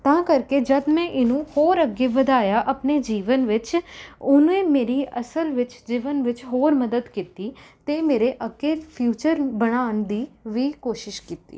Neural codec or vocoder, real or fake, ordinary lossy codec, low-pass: none; real; none; none